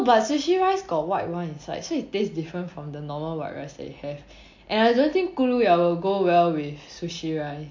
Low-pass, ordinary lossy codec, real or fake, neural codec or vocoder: 7.2 kHz; MP3, 48 kbps; real; none